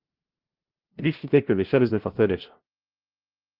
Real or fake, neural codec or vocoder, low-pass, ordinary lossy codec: fake; codec, 16 kHz, 0.5 kbps, FunCodec, trained on LibriTTS, 25 frames a second; 5.4 kHz; Opus, 16 kbps